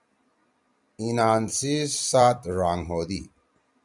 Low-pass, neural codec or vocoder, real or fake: 10.8 kHz; vocoder, 44.1 kHz, 128 mel bands every 256 samples, BigVGAN v2; fake